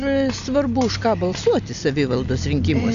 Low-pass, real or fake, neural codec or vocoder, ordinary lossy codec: 7.2 kHz; real; none; AAC, 96 kbps